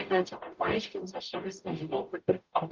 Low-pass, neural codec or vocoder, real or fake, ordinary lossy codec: 7.2 kHz; codec, 44.1 kHz, 0.9 kbps, DAC; fake; Opus, 32 kbps